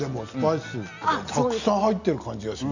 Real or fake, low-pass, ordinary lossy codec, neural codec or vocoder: real; 7.2 kHz; none; none